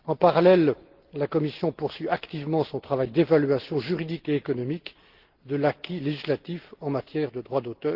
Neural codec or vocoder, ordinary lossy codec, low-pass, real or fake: none; Opus, 16 kbps; 5.4 kHz; real